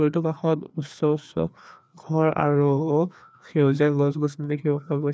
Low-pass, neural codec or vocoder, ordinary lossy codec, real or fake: none; codec, 16 kHz, 2 kbps, FreqCodec, larger model; none; fake